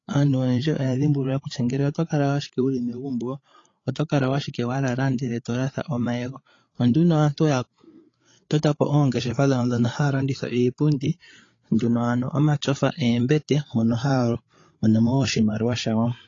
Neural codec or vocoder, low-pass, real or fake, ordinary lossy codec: codec, 16 kHz, 8 kbps, FreqCodec, larger model; 7.2 kHz; fake; AAC, 32 kbps